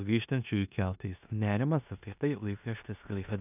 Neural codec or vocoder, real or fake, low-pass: codec, 16 kHz in and 24 kHz out, 0.9 kbps, LongCat-Audio-Codec, four codebook decoder; fake; 3.6 kHz